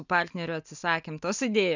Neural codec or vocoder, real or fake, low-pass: none; real; 7.2 kHz